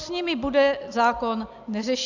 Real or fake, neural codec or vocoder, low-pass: real; none; 7.2 kHz